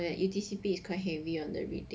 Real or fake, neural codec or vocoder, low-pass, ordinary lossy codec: real; none; none; none